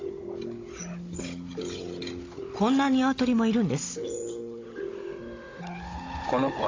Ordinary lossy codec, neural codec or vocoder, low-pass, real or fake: AAC, 32 kbps; codec, 16 kHz, 16 kbps, FunCodec, trained on Chinese and English, 50 frames a second; 7.2 kHz; fake